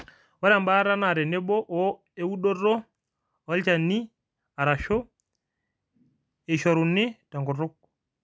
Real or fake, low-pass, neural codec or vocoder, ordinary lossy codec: real; none; none; none